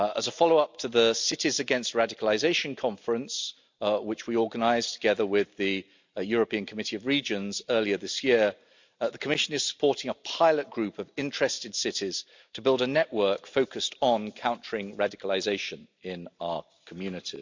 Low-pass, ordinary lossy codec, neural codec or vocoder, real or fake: 7.2 kHz; MP3, 64 kbps; none; real